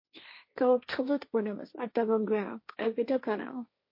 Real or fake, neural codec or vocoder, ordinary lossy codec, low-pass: fake; codec, 16 kHz, 1.1 kbps, Voila-Tokenizer; MP3, 32 kbps; 5.4 kHz